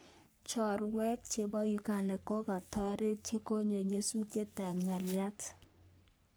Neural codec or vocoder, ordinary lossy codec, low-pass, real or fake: codec, 44.1 kHz, 3.4 kbps, Pupu-Codec; none; none; fake